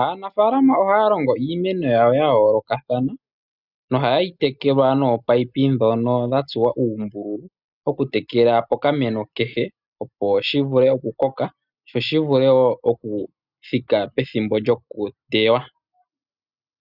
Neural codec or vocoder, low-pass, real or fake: none; 5.4 kHz; real